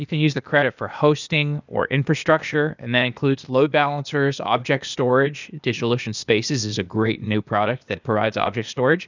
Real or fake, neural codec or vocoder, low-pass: fake; codec, 16 kHz, 0.8 kbps, ZipCodec; 7.2 kHz